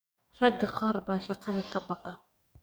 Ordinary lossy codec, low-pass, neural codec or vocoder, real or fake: none; none; codec, 44.1 kHz, 2.6 kbps, DAC; fake